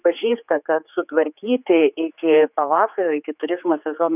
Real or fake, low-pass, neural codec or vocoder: fake; 3.6 kHz; codec, 16 kHz, 4 kbps, X-Codec, HuBERT features, trained on general audio